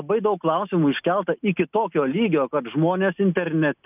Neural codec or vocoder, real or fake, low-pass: none; real; 3.6 kHz